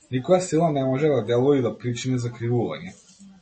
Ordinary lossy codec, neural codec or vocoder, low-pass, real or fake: MP3, 32 kbps; none; 10.8 kHz; real